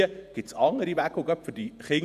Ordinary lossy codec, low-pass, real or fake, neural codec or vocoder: none; 14.4 kHz; fake; vocoder, 48 kHz, 128 mel bands, Vocos